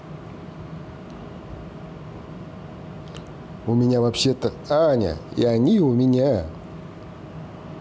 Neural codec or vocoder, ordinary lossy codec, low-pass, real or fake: none; none; none; real